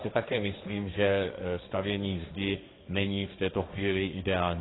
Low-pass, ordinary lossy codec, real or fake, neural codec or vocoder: 7.2 kHz; AAC, 16 kbps; fake; codec, 16 kHz, 1.1 kbps, Voila-Tokenizer